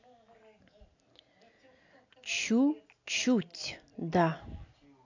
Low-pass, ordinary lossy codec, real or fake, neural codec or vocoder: 7.2 kHz; AAC, 48 kbps; real; none